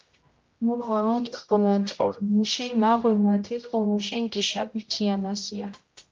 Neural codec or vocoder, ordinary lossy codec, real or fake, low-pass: codec, 16 kHz, 0.5 kbps, X-Codec, HuBERT features, trained on general audio; Opus, 24 kbps; fake; 7.2 kHz